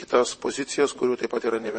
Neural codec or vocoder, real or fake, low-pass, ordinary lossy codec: vocoder, 44.1 kHz, 128 mel bands, Pupu-Vocoder; fake; 10.8 kHz; MP3, 32 kbps